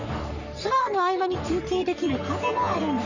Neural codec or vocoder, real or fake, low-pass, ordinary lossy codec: codec, 44.1 kHz, 3.4 kbps, Pupu-Codec; fake; 7.2 kHz; none